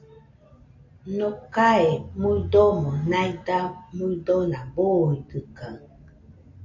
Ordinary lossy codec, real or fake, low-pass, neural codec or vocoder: MP3, 48 kbps; real; 7.2 kHz; none